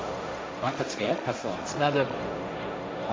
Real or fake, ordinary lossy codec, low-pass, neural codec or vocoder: fake; none; none; codec, 16 kHz, 1.1 kbps, Voila-Tokenizer